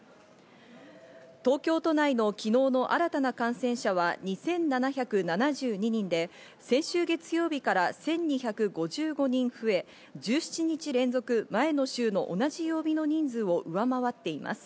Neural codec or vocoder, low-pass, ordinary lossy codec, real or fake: none; none; none; real